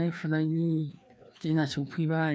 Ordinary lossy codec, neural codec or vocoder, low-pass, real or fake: none; codec, 16 kHz, 2 kbps, FreqCodec, larger model; none; fake